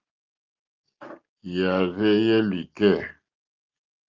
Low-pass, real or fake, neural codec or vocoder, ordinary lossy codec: 7.2 kHz; real; none; Opus, 32 kbps